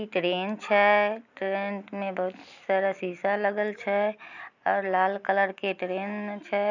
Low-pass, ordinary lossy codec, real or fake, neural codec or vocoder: 7.2 kHz; none; real; none